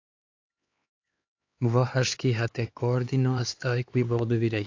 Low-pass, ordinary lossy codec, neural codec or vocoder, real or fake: 7.2 kHz; AAC, 48 kbps; codec, 16 kHz, 4 kbps, X-Codec, HuBERT features, trained on LibriSpeech; fake